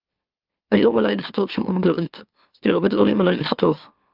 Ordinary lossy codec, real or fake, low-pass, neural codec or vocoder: Opus, 24 kbps; fake; 5.4 kHz; autoencoder, 44.1 kHz, a latent of 192 numbers a frame, MeloTTS